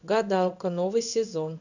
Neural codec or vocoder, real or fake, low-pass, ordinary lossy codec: codec, 16 kHz in and 24 kHz out, 1 kbps, XY-Tokenizer; fake; 7.2 kHz; none